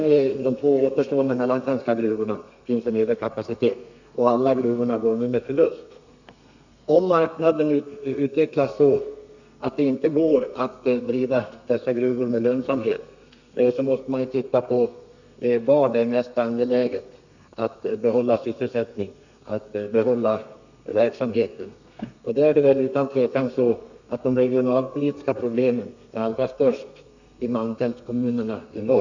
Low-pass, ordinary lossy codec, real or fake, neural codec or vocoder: 7.2 kHz; none; fake; codec, 32 kHz, 1.9 kbps, SNAC